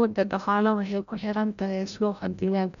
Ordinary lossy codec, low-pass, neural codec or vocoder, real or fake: none; 7.2 kHz; codec, 16 kHz, 0.5 kbps, FreqCodec, larger model; fake